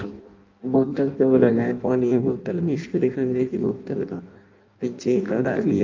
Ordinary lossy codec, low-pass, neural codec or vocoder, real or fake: Opus, 24 kbps; 7.2 kHz; codec, 16 kHz in and 24 kHz out, 0.6 kbps, FireRedTTS-2 codec; fake